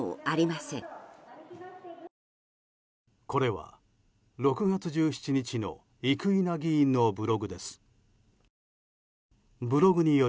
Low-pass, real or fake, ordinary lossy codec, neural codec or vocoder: none; real; none; none